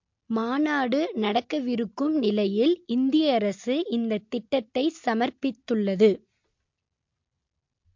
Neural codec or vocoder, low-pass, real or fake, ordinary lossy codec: none; 7.2 kHz; real; MP3, 48 kbps